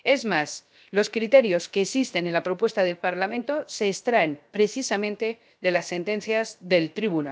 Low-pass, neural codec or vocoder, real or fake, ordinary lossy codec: none; codec, 16 kHz, 0.7 kbps, FocalCodec; fake; none